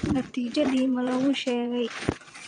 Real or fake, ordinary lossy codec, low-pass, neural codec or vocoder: fake; none; 9.9 kHz; vocoder, 22.05 kHz, 80 mel bands, Vocos